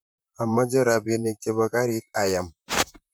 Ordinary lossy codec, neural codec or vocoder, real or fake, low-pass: none; vocoder, 44.1 kHz, 128 mel bands, Pupu-Vocoder; fake; none